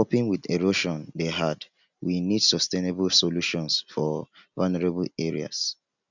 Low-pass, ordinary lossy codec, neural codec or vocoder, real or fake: 7.2 kHz; none; none; real